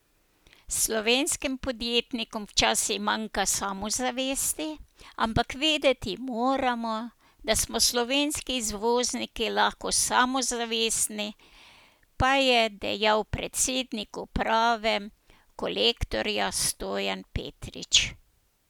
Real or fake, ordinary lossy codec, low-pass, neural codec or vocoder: real; none; none; none